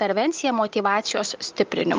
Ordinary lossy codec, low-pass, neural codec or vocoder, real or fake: Opus, 32 kbps; 7.2 kHz; none; real